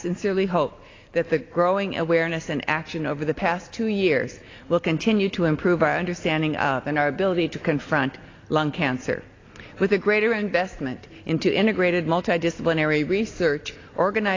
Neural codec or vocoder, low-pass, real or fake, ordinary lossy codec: none; 7.2 kHz; real; AAC, 32 kbps